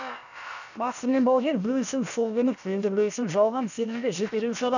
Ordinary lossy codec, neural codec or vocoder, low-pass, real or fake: none; codec, 16 kHz, about 1 kbps, DyCAST, with the encoder's durations; 7.2 kHz; fake